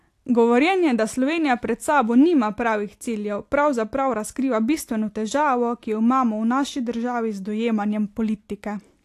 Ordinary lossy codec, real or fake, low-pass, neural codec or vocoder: AAC, 64 kbps; real; 14.4 kHz; none